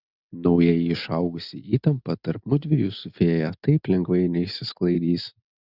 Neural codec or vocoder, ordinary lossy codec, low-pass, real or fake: none; Opus, 64 kbps; 5.4 kHz; real